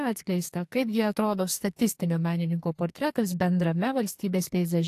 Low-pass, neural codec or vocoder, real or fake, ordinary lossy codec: 14.4 kHz; codec, 44.1 kHz, 2.6 kbps, SNAC; fake; AAC, 64 kbps